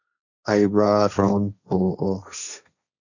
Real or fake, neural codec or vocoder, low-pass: fake; codec, 16 kHz, 1.1 kbps, Voila-Tokenizer; 7.2 kHz